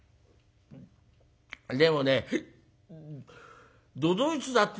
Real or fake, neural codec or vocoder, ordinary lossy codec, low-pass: real; none; none; none